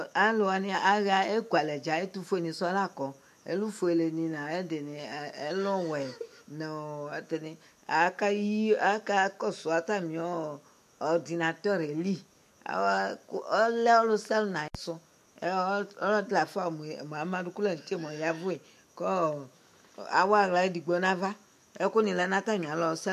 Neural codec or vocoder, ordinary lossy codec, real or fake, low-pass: autoencoder, 48 kHz, 128 numbers a frame, DAC-VAE, trained on Japanese speech; MP3, 64 kbps; fake; 14.4 kHz